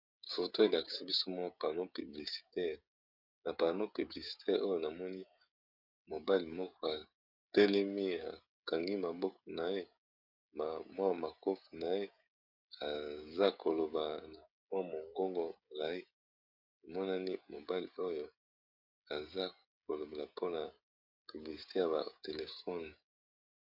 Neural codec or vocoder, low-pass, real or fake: codec, 16 kHz, 16 kbps, FreqCodec, smaller model; 5.4 kHz; fake